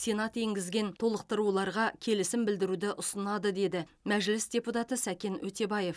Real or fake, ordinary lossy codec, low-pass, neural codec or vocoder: real; none; none; none